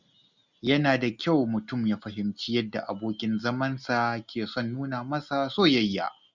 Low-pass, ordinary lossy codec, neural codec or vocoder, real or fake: 7.2 kHz; none; none; real